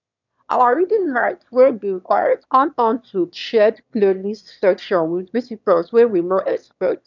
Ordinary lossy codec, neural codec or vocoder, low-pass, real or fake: none; autoencoder, 22.05 kHz, a latent of 192 numbers a frame, VITS, trained on one speaker; 7.2 kHz; fake